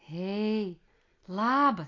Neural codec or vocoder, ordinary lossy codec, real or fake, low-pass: none; AAC, 32 kbps; real; 7.2 kHz